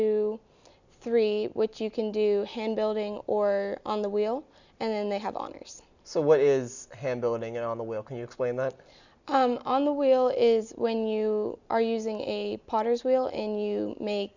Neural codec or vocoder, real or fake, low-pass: none; real; 7.2 kHz